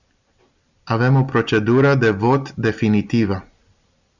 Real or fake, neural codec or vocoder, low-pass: real; none; 7.2 kHz